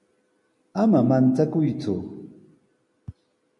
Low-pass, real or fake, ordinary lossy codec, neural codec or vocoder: 10.8 kHz; real; MP3, 48 kbps; none